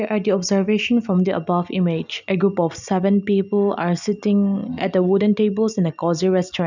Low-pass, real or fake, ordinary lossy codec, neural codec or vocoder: 7.2 kHz; real; none; none